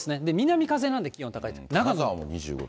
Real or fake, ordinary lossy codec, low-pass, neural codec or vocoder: real; none; none; none